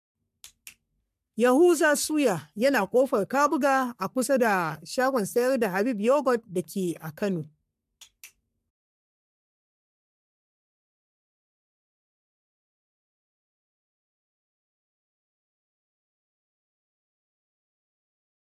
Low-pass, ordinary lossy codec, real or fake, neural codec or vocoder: 14.4 kHz; MP3, 96 kbps; fake; codec, 44.1 kHz, 3.4 kbps, Pupu-Codec